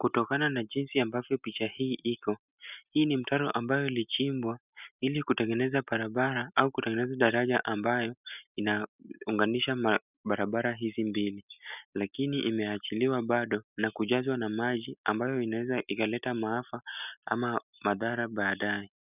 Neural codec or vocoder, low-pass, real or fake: none; 3.6 kHz; real